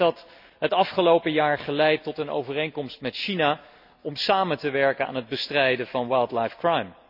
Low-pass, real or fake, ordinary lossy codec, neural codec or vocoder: 5.4 kHz; real; none; none